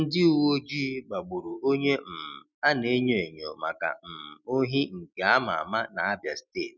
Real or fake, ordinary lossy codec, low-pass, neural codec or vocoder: real; none; 7.2 kHz; none